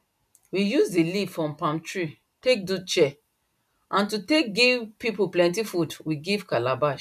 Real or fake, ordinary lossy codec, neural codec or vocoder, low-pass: real; none; none; 14.4 kHz